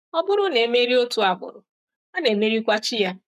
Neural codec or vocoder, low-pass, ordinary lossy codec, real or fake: codec, 44.1 kHz, 7.8 kbps, Pupu-Codec; 14.4 kHz; none; fake